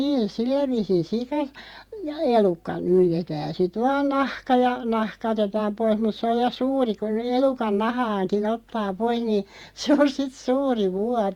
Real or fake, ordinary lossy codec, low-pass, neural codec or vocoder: fake; Opus, 64 kbps; 19.8 kHz; vocoder, 48 kHz, 128 mel bands, Vocos